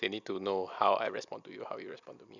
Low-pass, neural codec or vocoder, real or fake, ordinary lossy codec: 7.2 kHz; none; real; none